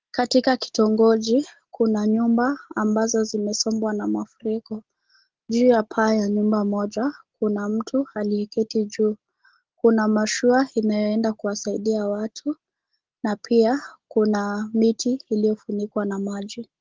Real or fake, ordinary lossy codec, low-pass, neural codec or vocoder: real; Opus, 16 kbps; 7.2 kHz; none